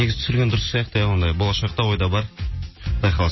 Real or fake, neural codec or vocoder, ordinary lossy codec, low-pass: real; none; MP3, 24 kbps; 7.2 kHz